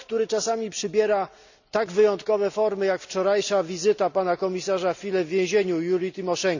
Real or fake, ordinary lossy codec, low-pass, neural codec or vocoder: real; none; 7.2 kHz; none